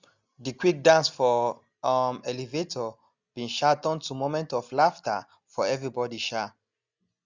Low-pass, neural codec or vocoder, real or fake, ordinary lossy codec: 7.2 kHz; none; real; Opus, 64 kbps